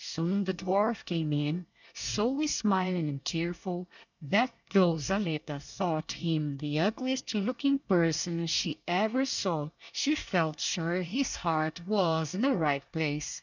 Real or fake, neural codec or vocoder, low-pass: fake; codec, 24 kHz, 1 kbps, SNAC; 7.2 kHz